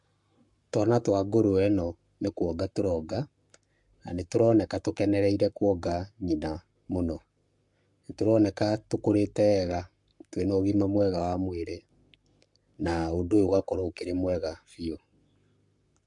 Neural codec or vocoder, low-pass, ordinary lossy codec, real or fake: codec, 44.1 kHz, 7.8 kbps, Pupu-Codec; 10.8 kHz; MP3, 64 kbps; fake